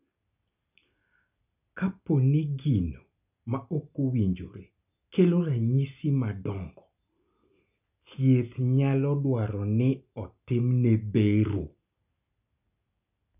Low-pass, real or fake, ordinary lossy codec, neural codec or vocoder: 3.6 kHz; real; none; none